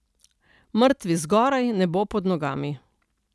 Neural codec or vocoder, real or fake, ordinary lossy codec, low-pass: none; real; none; none